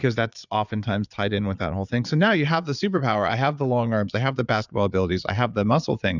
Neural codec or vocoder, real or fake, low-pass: vocoder, 22.05 kHz, 80 mel bands, Vocos; fake; 7.2 kHz